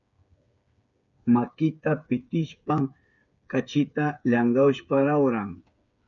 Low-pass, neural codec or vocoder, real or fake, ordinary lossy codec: 7.2 kHz; codec, 16 kHz, 8 kbps, FreqCodec, smaller model; fake; MP3, 96 kbps